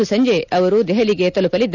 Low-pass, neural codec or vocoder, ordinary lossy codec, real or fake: 7.2 kHz; none; none; real